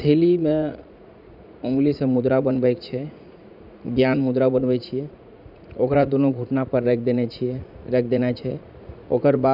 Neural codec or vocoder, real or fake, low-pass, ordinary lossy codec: vocoder, 44.1 kHz, 80 mel bands, Vocos; fake; 5.4 kHz; none